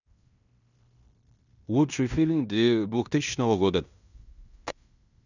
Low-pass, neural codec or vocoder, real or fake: 7.2 kHz; codec, 16 kHz in and 24 kHz out, 0.9 kbps, LongCat-Audio-Codec, fine tuned four codebook decoder; fake